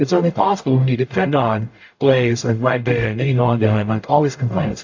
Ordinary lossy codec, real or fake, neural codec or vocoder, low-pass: AAC, 48 kbps; fake; codec, 44.1 kHz, 0.9 kbps, DAC; 7.2 kHz